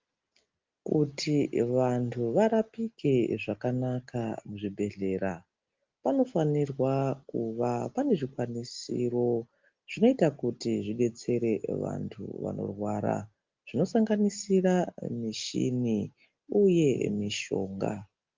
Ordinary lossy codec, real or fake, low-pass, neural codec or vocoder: Opus, 32 kbps; real; 7.2 kHz; none